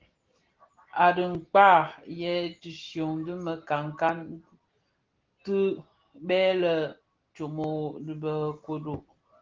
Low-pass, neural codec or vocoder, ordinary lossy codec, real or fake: 7.2 kHz; none; Opus, 16 kbps; real